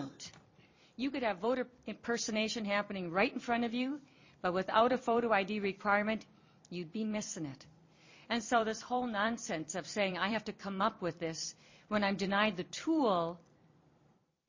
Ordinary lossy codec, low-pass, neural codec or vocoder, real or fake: MP3, 32 kbps; 7.2 kHz; vocoder, 44.1 kHz, 128 mel bands every 256 samples, BigVGAN v2; fake